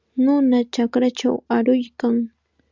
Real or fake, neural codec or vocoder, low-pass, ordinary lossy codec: real; none; 7.2 kHz; Opus, 64 kbps